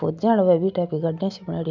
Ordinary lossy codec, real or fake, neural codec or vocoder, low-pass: none; real; none; 7.2 kHz